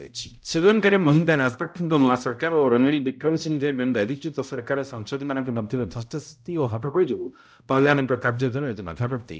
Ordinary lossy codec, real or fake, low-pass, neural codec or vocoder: none; fake; none; codec, 16 kHz, 0.5 kbps, X-Codec, HuBERT features, trained on balanced general audio